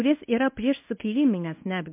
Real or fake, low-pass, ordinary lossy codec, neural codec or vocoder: fake; 3.6 kHz; MP3, 24 kbps; codec, 24 kHz, 0.9 kbps, WavTokenizer, medium speech release version 1